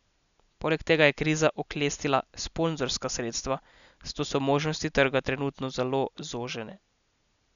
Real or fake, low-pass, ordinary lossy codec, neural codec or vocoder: real; 7.2 kHz; none; none